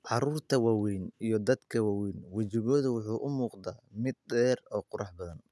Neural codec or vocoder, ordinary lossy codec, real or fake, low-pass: none; none; real; none